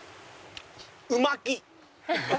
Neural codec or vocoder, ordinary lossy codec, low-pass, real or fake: none; none; none; real